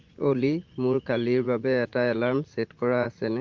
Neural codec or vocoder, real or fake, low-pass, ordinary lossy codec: vocoder, 44.1 kHz, 80 mel bands, Vocos; fake; 7.2 kHz; Opus, 32 kbps